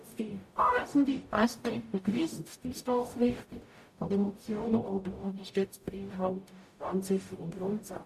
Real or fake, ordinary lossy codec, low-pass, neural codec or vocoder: fake; AAC, 96 kbps; 14.4 kHz; codec, 44.1 kHz, 0.9 kbps, DAC